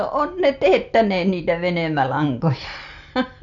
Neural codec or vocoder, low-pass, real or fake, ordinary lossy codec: none; 7.2 kHz; real; none